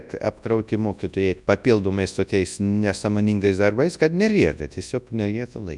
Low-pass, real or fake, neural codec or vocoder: 10.8 kHz; fake; codec, 24 kHz, 0.9 kbps, WavTokenizer, large speech release